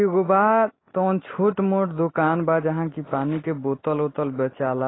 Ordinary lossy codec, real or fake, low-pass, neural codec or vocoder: AAC, 16 kbps; real; 7.2 kHz; none